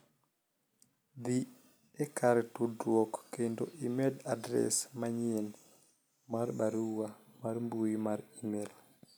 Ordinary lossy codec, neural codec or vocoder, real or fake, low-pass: none; none; real; none